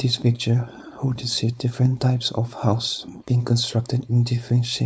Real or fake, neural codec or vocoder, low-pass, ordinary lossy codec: fake; codec, 16 kHz, 4.8 kbps, FACodec; none; none